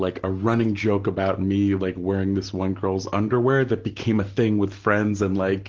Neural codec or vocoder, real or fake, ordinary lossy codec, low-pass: none; real; Opus, 16 kbps; 7.2 kHz